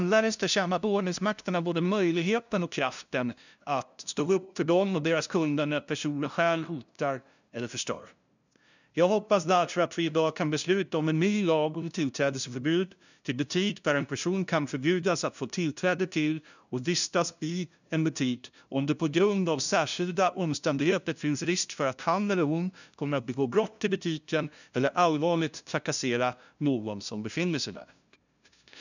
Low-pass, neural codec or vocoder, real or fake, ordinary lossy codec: 7.2 kHz; codec, 16 kHz, 0.5 kbps, FunCodec, trained on LibriTTS, 25 frames a second; fake; none